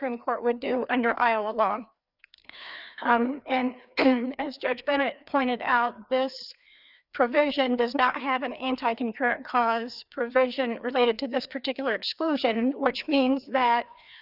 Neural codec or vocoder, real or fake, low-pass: codec, 16 kHz, 2 kbps, FreqCodec, larger model; fake; 5.4 kHz